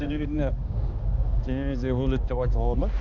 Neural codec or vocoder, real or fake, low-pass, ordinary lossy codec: codec, 16 kHz, 2 kbps, X-Codec, HuBERT features, trained on balanced general audio; fake; 7.2 kHz; none